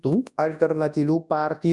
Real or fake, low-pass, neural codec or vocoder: fake; 10.8 kHz; codec, 24 kHz, 0.9 kbps, WavTokenizer, large speech release